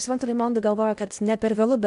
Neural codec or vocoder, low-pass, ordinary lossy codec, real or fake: codec, 16 kHz in and 24 kHz out, 0.8 kbps, FocalCodec, streaming, 65536 codes; 10.8 kHz; MP3, 96 kbps; fake